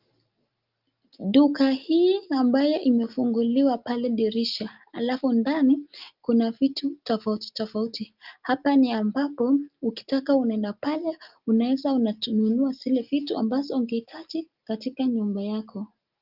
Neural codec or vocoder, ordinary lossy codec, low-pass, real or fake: none; Opus, 24 kbps; 5.4 kHz; real